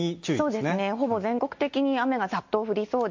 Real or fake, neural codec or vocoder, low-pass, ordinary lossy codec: real; none; 7.2 kHz; MP3, 48 kbps